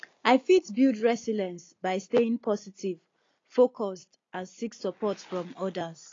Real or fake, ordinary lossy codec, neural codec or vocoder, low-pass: real; AAC, 32 kbps; none; 7.2 kHz